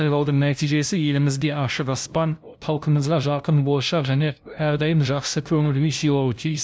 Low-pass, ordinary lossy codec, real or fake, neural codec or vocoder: none; none; fake; codec, 16 kHz, 0.5 kbps, FunCodec, trained on LibriTTS, 25 frames a second